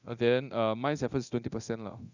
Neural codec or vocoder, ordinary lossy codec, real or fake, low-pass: codec, 24 kHz, 0.9 kbps, DualCodec; none; fake; 7.2 kHz